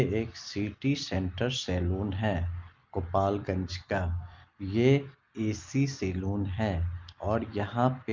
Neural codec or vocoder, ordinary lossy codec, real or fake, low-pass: none; Opus, 32 kbps; real; 7.2 kHz